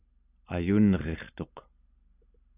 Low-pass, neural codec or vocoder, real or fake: 3.6 kHz; none; real